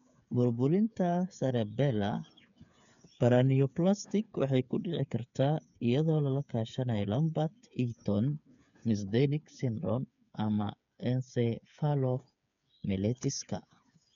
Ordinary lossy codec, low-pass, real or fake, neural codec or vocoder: none; 7.2 kHz; fake; codec, 16 kHz, 8 kbps, FreqCodec, smaller model